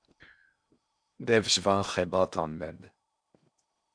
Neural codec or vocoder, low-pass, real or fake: codec, 16 kHz in and 24 kHz out, 0.8 kbps, FocalCodec, streaming, 65536 codes; 9.9 kHz; fake